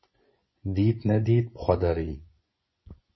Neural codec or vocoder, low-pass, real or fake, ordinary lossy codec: vocoder, 24 kHz, 100 mel bands, Vocos; 7.2 kHz; fake; MP3, 24 kbps